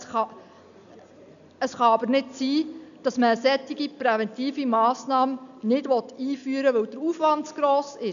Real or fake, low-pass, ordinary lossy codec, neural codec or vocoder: real; 7.2 kHz; none; none